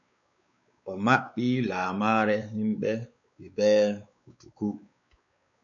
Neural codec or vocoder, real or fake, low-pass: codec, 16 kHz, 4 kbps, X-Codec, WavLM features, trained on Multilingual LibriSpeech; fake; 7.2 kHz